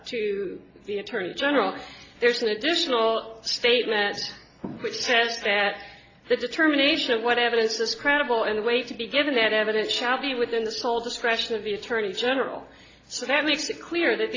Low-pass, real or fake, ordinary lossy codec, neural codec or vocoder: 7.2 kHz; real; AAC, 32 kbps; none